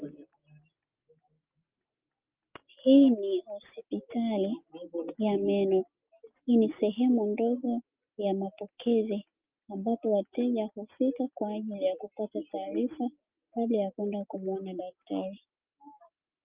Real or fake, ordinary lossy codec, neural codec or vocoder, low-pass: real; Opus, 24 kbps; none; 3.6 kHz